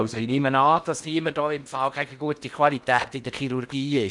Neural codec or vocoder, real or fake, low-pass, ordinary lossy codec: codec, 16 kHz in and 24 kHz out, 0.8 kbps, FocalCodec, streaming, 65536 codes; fake; 10.8 kHz; none